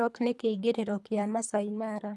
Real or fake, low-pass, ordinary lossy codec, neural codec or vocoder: fake; none; none; codec, 24 kHz, 3 kbps, HILCodec